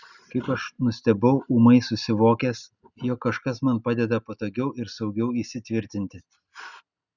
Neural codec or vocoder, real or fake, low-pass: none; real; 7.2 kHz